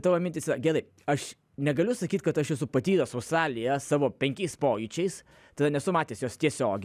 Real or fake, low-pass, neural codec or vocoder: real; 14.4 kHz; none